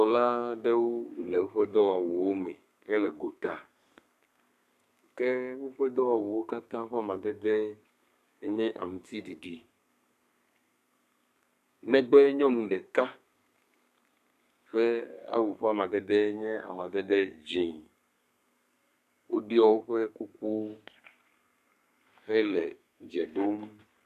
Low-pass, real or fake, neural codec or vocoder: 14.4 kHz; fake; codec, 32 kHz, 1.9 kbps, SNAC